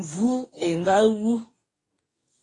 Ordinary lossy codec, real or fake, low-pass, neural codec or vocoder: AAC, 32 kbps; fake; 10.8 kHz; codec, 44.1 kHz, 2.6 kbps, DAC